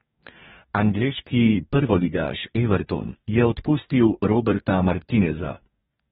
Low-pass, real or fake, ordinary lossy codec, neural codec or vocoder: 19.8 kHz; fake; AAC, 16 kbps; codec, 44.1 kHz, 2.6 kbps, DAC